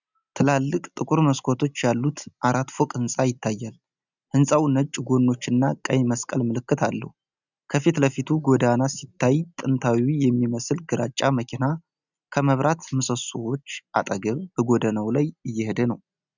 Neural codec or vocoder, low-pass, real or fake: none; 7.2 kHz; real